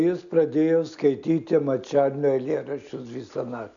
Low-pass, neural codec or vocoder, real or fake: 7.2 kHz; none; real